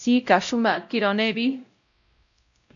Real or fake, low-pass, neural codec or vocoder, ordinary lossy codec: fake; 7.2 kHz; codec, 16 kHz, 0.5 kbps, X-Codec, WavLM features, trained on Multilingual LibriSpeech; MP3, 96 kbps